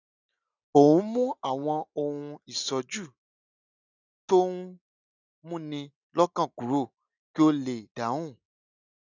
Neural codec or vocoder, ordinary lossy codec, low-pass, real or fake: none; none; 7.2 kHz; real